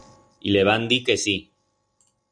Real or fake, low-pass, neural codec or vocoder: real; 9.9 kHz; none